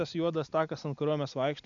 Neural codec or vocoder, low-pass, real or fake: none; 7.2 kHz; real